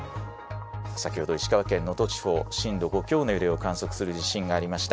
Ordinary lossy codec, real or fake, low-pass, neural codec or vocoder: none; real; none; none